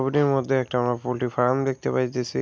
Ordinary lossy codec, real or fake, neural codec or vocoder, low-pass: none; real; none; none